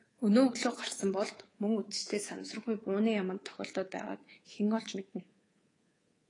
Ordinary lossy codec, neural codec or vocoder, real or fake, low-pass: AAC, 32 kbps; codec, 24 kHz, 3.1 kbps, DualCodec; fake; 10.8 kHz